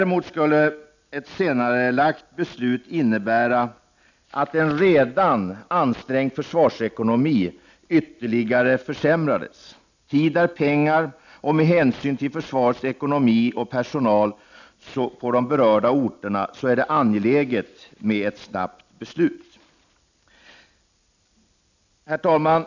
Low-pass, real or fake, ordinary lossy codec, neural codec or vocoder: 7.2 kHz; real; none; none